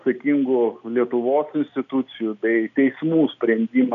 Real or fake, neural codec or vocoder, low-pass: real; none; 7.2 kHz